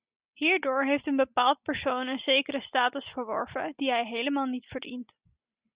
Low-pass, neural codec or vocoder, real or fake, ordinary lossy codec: 3.6 kHz; none; real; Opus, 64 kbps